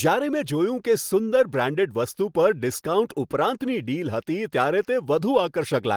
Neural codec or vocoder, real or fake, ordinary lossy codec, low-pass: none; real; Opus, 24 kbps; 19.8 kHz